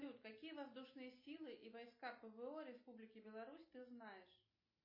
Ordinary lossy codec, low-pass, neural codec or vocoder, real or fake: MP3, 24 kbps; 5.4 kHz; none; real